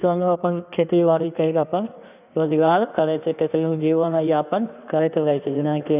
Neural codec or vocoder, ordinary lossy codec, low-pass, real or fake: codec, 16 kHz in and 24 kHz out, 1.1 kbps, FireRedTTS-2 codec; none; 3.6 kHz; fake